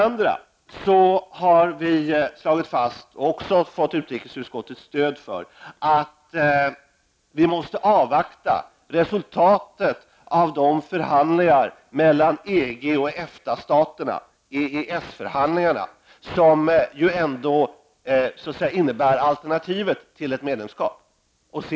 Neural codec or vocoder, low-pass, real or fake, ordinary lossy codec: none; none; real; none